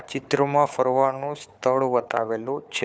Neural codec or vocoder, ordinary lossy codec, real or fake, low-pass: codec, 16 kHz, 4 kbps, FunCodec, trained on Chinese and English, 50 frames a second; none; fake; none